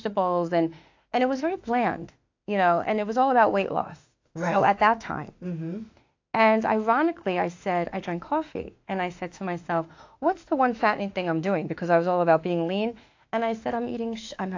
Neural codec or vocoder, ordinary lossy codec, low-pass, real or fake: autoencoder, 48 kHz, 32 numbers a frame, DAC-VAE, trained on Japanese speech; AAC, 48 kbps; 7.2 kHz; fake